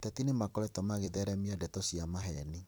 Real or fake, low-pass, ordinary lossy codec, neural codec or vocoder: fake; none; none; vocoder, 44.1 kHz, 128 mel bands every 256 samples, BigVGAN v2